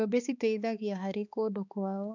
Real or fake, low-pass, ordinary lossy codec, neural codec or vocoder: fake; 7.2 kHz; none; codec, 16 kHz, 2 kbps, X-Codec, HuBERT features, trained on balanced general audio